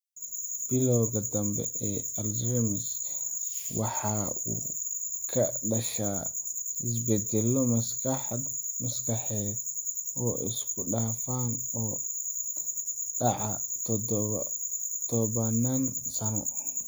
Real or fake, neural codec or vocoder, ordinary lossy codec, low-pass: real; none; none; none